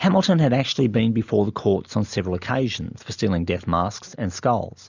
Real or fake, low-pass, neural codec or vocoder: real; 7.2 kHz; none